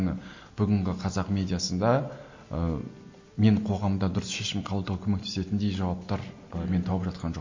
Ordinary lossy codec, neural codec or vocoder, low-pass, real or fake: MP3, 32 kbps; none; 7.2 kHz; real